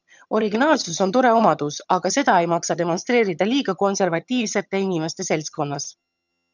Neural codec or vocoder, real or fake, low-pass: vocoder, 22.05 kHz, 80 mel bands, HiFi-GAN; fake; 7.2 kHz